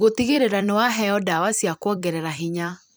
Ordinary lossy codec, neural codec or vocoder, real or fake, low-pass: none; vocoder, 44.1 kHz, 128 mel bands every 256 samples, BigVGAN v2; fake; none